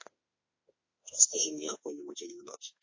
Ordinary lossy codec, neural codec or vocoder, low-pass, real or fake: MP3, 32 kbps; autoencoder, 48 kHz, 32 numbers a frame, DAC-VAE, trained on Japanese speech; 7.2 kHz; fake